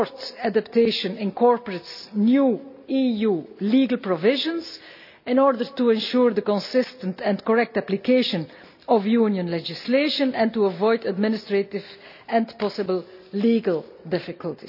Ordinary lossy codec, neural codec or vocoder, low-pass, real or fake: none; none; 5.4 kHz; real